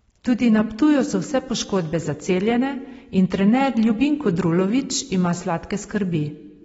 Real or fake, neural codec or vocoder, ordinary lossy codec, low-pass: real; none; AAC, 24 kbps; 19.8 kHz